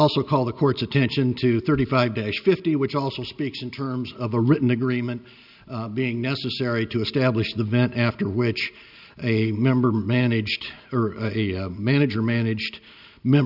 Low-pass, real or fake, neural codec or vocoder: 5.4 kHz; real; none